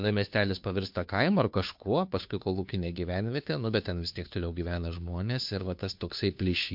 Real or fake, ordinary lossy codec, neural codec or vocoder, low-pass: fake; MP3, 48 kbps; codec, 16 kHz, 2 kbps, FunCodec, trained on Chinese and English, 25 frames a second; 5.4 kHz